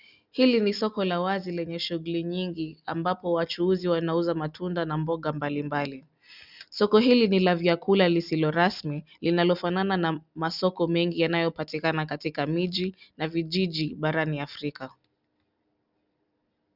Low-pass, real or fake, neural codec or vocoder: 5.4 kHz; real; none